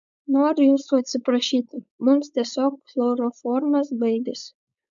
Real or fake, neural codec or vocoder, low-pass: fake; codec, 16 kHz, 4.8 kbps, FACodec; 7.2 kHz